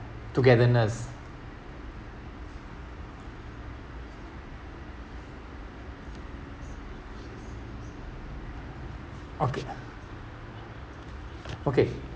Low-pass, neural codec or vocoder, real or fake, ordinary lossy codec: none; none; real; none